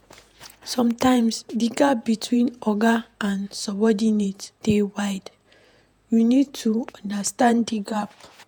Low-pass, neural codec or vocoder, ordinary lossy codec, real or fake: none; vocoder, 48 kHz, 128 mel bands, Vocos; none; fake